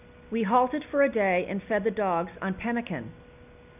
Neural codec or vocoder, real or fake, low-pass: none; real; 3.6 kHz